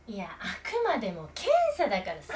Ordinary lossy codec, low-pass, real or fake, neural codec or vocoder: none; none; real; none